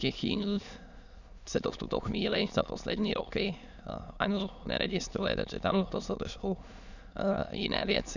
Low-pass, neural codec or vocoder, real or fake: 7.2 kHz; autoencoder, 22.05 kHz, a latent of 192 numbers a frame, VITS, trained on many speakers; fake